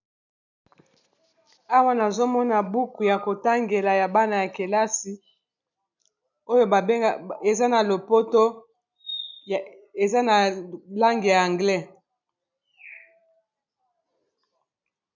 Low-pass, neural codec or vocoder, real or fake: 7.2 kHz; none; real